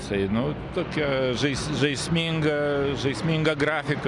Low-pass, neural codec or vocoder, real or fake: 10.8 kHz; none; real